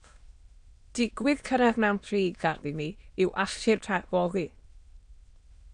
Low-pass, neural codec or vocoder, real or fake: 9.9 kHz; autoencoder, 22.05 kHz, a latent of 192 numbers a frame, VITS, trained on many speakers; fake